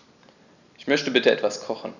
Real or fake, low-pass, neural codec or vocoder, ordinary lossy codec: real; 7.2 kHz; none; none